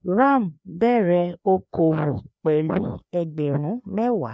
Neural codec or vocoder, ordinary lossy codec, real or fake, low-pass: codec, 16 kHz, 2 kbps, FreqCodec, larger model; none; fake; none